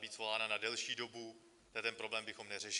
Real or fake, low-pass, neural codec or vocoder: real; 10.8 kHz; none